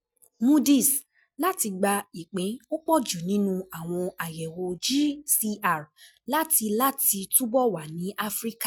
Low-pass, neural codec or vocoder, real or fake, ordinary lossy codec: none; none; real; none